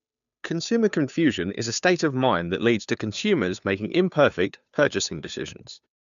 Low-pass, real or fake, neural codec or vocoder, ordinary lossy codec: 7.2 kHz; fake; codec, 16 kHz, 2 kbps, FunCodec, trained on Chinese and English, 25 frames a second; none